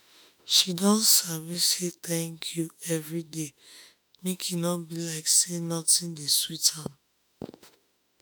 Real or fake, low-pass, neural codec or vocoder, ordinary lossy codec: fake; none; autoencoder, 48 kHz, 32 numbers a frame, DAC-VAE, trained on Japanese speech; none